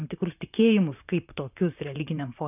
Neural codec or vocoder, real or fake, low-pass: vocoder, 44.1 kHz, 128 mel bands, Pupu-Vocoder; fake; 3.6 kHz